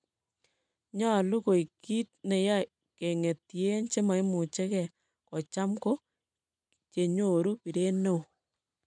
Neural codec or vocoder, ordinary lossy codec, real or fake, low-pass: none; none; real; 9.9 kHz